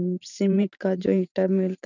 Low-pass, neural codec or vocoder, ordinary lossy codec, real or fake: 7.2 kHz; vocoder, 44.1 kHz, 128 mel bands every 512 samples, BigVGAN v2; none; fake